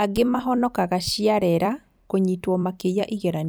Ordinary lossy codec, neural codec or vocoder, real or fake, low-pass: none; vocoder, 44.1 kHz, 128 mel bands every 256 samples, BigVGAN v2; fake; none